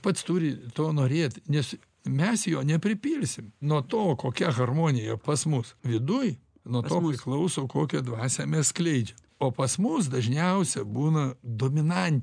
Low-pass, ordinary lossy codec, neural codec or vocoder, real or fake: 9.9 kHz; MP3, 96 kbps; none; real